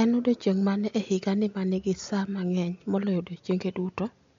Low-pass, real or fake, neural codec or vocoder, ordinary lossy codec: 7.2 kHz; real; none; MP3, 48 kbps